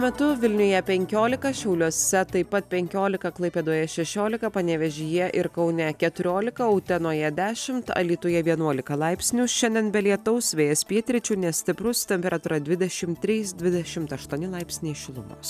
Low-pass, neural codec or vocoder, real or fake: 14.4 kHz; none; real